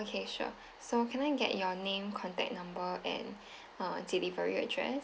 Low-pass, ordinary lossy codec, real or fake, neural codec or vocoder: none; none; real; none